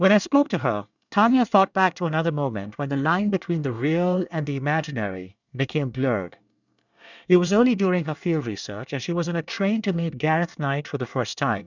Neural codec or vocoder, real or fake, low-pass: codec, 24 kHz, 1 kbps, SNAC; fake; 7.2 kHz